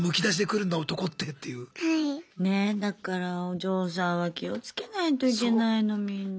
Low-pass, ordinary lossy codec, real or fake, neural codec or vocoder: none; none; real; none